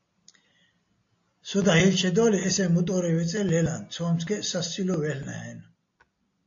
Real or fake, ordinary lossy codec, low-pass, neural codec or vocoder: real; AAC, 48 kbps; 7.2 kHz; none